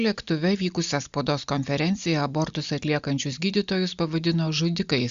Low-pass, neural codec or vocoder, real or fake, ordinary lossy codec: 7.2 kHz; none; real; Opus, 64 kbps